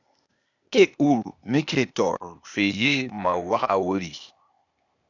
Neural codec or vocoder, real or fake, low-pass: codec, 16 kHz, 0.8 kbps, ZipCodec; fake; 7.2 kHz